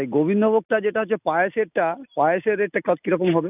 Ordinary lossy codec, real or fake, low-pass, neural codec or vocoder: none; real; 3.6 kHz; none